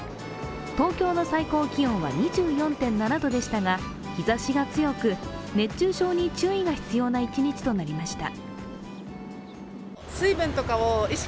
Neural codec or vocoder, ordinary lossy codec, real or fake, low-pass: none; none; real; none